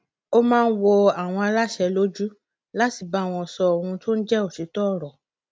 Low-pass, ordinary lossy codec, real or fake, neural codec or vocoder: none; none; real; none